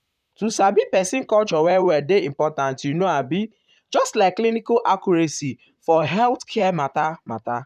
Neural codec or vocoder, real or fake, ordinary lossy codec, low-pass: vocoder, 44.1 kHz, 128 mel bands, Pupu-Vocoder; fake; none; 14.4 kHz